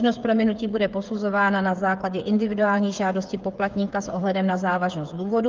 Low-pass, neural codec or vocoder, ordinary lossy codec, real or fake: 7.2 kHz; codec, 16 kHz, 8 kbps, FreqCodec, smaller model; Opus, 24 kbps; fake